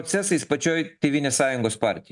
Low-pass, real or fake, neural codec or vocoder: 10.8 kHz; real; none